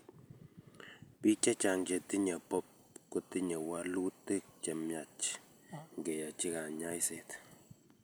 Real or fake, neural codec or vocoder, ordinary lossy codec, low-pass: real; none; none; none